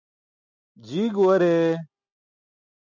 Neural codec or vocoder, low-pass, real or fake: none; 7.2 kHz; real